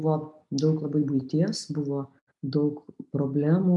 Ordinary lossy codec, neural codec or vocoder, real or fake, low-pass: MP3, 96 kbps; none; real; 10.8 kHz